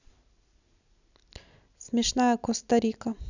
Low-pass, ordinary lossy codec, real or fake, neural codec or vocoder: 7.2 kHz; none; real; none